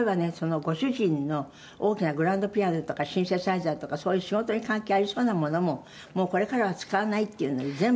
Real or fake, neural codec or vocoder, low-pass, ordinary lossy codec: real; none; none; none